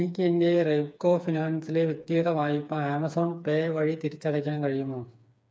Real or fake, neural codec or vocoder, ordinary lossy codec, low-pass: fake; codec, 16 kHz, 4 kbps, FreqCodec, smaller model; none; none